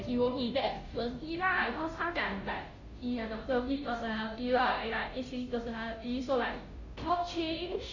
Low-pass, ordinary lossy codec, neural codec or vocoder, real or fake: 7.2 kHz; none; codec, 16 kHz, 0.5 kbps, FunCodec, trained on Chinese and English, 25 frames a second; fake